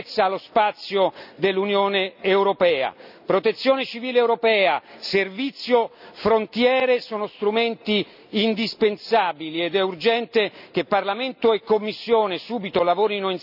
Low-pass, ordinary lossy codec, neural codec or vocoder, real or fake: 5.4 kHz; none; none; real